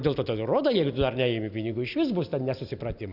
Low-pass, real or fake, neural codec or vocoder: 5.4 kHz; real; none